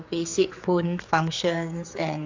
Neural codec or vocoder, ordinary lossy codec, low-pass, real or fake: codec, 16 kHz, 4 kbps, X-Codec, HuBERT features, trained on general audio; AAC, 48 kbps; 7.2 kHz; fake